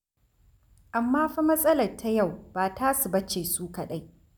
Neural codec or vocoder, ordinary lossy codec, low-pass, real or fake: none; none; none; real